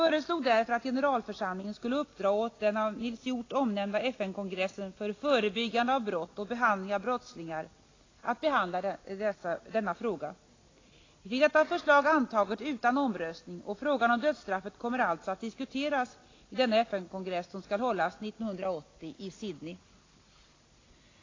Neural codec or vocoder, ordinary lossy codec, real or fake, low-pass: none; AAC, 32 kbps; real; 7.2 kHz